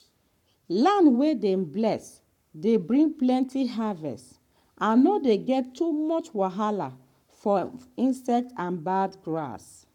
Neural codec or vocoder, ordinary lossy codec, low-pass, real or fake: codec, 44.1 kHz, 7.8 kbps, Pupu-Codec; none; 19.8 kHz; fake